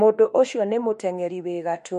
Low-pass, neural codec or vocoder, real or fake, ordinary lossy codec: 14.4 kHz; autoencoder, 48 kHz, 32 numbers a frame, DAC-VAE, trained on Japanese speech; fake; MP3, 48 kbps